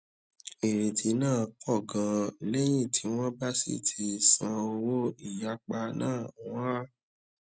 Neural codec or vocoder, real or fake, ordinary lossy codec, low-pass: none; real; none; none